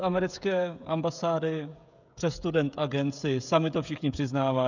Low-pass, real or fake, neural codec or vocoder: 7.2 kHz; fake; codec, 16 kHz, 16 kbps, FreqCodec, smaller model